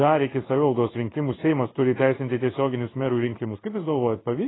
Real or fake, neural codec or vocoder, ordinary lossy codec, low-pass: fake; codec, 16 kHz in and 24 kHz out, 1 kbps, XY-Tokenizer; AAC, 16 kbps; 7.2 kHz